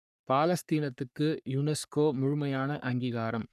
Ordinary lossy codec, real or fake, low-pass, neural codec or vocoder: none; fake; 14.4 kHz; codec, 44.1 kHz, 3.4 kbps, Pupu-Codec